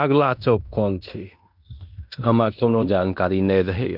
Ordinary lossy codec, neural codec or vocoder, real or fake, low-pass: none; codec, 16 kHz in and 24 kHz out, 0.9 kbps, LongCat-Audio-Codec, fine tuned four codebook decoder; fake; 5.4 kHz